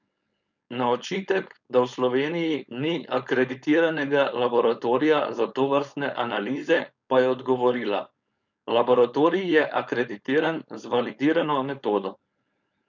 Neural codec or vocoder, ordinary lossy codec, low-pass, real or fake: codec, 16 kHz, 4.8 kbps, FACodec; none; 7.2 kHz; fake